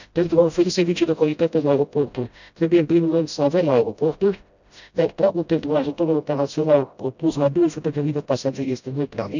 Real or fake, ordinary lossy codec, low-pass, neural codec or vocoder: fake; none; 7.2 kHz; codec, 16 kHz, 0.5 kbps, FreqCodec, smaller model